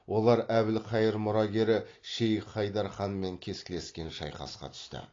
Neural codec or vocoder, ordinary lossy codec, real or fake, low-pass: none; AAC, 32 kbps; real; 7.2 kHz